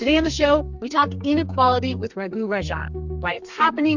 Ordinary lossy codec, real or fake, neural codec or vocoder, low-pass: MP3, 64 kbps; fake; codec, 32 kHz, 1.9 kbps, SNAC; 7.2 kHz